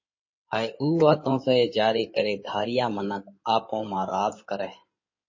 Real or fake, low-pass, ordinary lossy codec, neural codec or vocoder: fake; 7.2 kHz; MP3, 32 kbps; codec, 16 kHz in and 24 kHz out, 2.2 kbps, FireRedTTS-2 codec